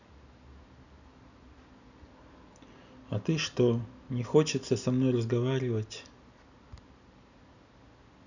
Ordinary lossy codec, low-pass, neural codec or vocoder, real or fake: none; 7.2 kHz; none; real